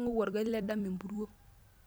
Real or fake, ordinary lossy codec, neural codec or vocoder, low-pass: fake; none; vocoder, 44.1 kHz, 128 mel bands every 256 samples, BigVGAN v2; none